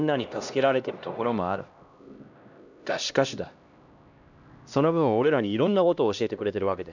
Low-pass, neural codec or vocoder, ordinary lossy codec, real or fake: 7.2 kHz; codec, 16 kHz, 1 kbps, X-Codec, HuBERT features, trained on LibriSpeech; none; fake